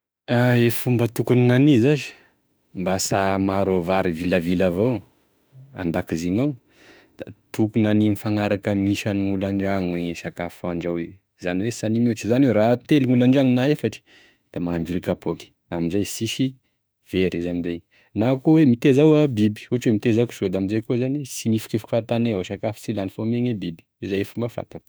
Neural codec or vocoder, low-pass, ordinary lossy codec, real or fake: autoencoder, 48 kHz, 32 numbers a frame, DAC-VAE, trained on Japanese speech; none; none; fake